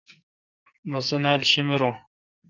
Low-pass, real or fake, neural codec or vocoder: 7.2 kHz; fake; codec, 44.1 kHz, 2.6 kbps, SNAC